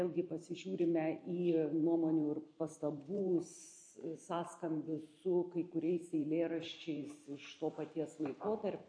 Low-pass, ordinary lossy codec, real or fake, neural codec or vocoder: 9.9 kHz; MP3, 48 kbps; fake; vocoder, 44.1 kHz, 128 mel bands every 512 samples, BigVGAN v2